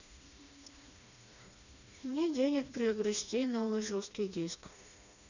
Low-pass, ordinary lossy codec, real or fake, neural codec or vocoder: 7.2 kHz; none; fake; codec, 16 kHz, 2 kbps, FreqCodec, smaller model